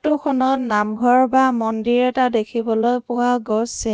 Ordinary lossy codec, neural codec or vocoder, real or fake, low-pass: none; codec, 16 kHz, about 1 kbps, DyCAST, with the encoder's durations; fake; none